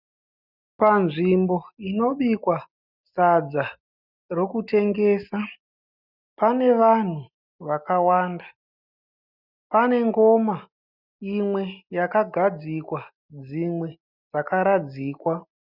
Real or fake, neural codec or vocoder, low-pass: real; none; 5.4 kHz